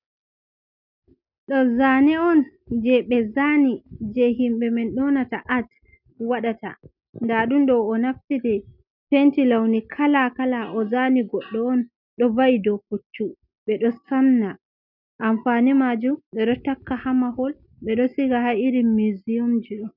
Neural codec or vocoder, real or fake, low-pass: none; real; 5.4 kHz